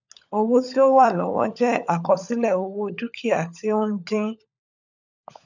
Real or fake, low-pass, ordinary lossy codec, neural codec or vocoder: fake; 7.2 kHz; none; codec, 16 kHz, 16 kbps, FunCodec, trained on LibriTTS, 50 frames a second